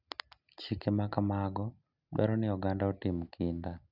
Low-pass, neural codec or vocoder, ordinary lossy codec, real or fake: 5.4 kHz; none; none; real